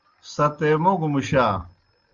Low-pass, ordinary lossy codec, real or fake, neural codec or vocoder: 7.2 kHz; Opus, 32 kbps; real; none